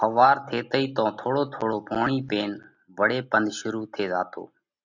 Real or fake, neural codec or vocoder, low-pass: real; none; 7.2 kHz